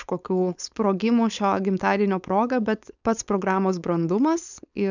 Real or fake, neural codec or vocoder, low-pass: fake; codec, 16 kHz, 4.8 kbps, FACodec; 7.2 kHz